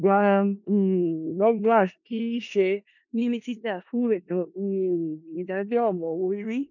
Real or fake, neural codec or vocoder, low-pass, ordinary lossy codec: fake; codec, 16 kHz in and 24 kHz out, 0.4 kbps, LongCat-Audio-Codec, four codebook decoder; 7.2 kHz; MP3, 48 kbps